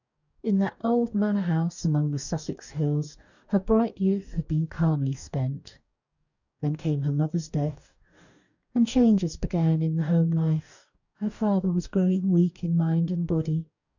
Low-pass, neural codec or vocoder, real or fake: 7.2 kHz; codec, 44.1 kHz, 2.6 kbps, DAC; fake